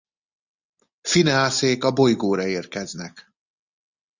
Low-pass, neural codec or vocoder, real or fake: 7.2 kHz; none; real